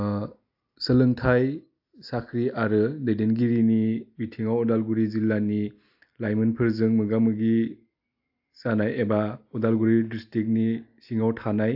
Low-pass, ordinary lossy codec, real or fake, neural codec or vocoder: 5.4 kHz; AAC, 48 kbps; real; none